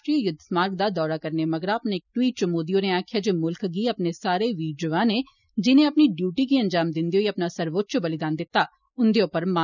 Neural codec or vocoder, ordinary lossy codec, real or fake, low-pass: none; none; real; 7.2 kHz